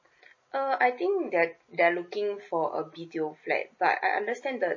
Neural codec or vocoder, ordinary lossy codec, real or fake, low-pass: none; MP3, 32 kbps; real; 7.2 kHz